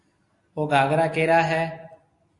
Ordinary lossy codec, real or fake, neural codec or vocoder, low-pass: AAC, 48 kbps; real; none; 10.8 kHz